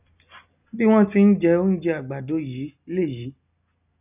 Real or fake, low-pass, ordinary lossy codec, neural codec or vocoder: real; 3.6 kHz; none; none